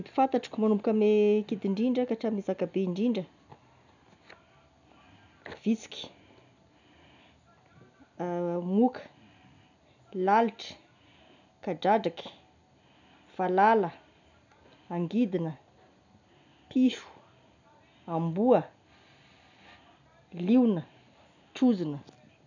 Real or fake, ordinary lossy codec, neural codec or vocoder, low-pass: real; none; none; 7.2 kHz